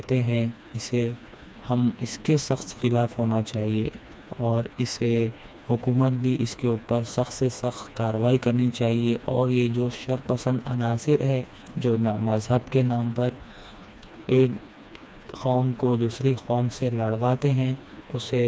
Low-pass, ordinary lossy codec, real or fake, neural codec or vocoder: none; none; fake; codec, 16 kHz, 2 kbps, FreqCodec, smaller model